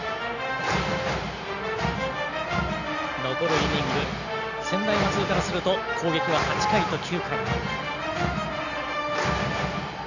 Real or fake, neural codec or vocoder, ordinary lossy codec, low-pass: real; none; none; 7.2 kHz